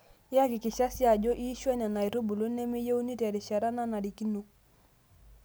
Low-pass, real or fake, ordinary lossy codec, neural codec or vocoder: none; real; none; none